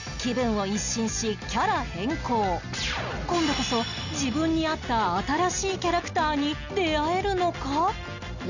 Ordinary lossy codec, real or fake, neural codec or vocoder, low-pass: none; real; none; 7.2 kHz